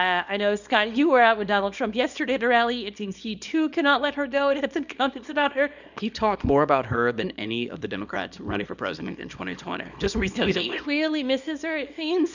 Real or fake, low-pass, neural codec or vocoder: fake; 7.2 kHz; codec, 24 kHz, 0.9 kbps, WavTokenizer, small release